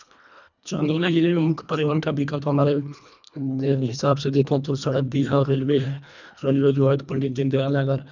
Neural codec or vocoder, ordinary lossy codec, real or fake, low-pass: codec, 24 kHz, 1.5 kbps, HILCodec; none; fake; 7.2 kHz